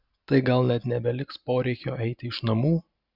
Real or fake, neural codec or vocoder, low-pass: real; none; 5.4 kHz